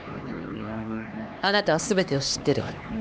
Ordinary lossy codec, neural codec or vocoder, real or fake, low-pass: none; codec, 16 kHz, 2 kbps, X-Codec, HuBERT features, trained on LibriSpeech; fake; none